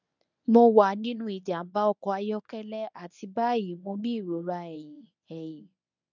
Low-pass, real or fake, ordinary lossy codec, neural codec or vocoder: 7.2 kHz; fake; none; codec, 24 kHz, 0.9 kbps, WavTokenizer, medium speech release version 1